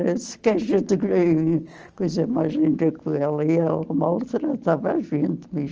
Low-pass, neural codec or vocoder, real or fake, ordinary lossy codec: 7.2 kHz; none; real; Opus, 24 kbps